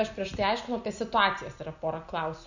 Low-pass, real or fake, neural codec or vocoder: 7.2 kHz; real; none